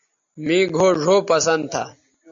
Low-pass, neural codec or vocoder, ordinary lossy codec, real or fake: 7.2 kHz; none; AAC, 64 kbps; real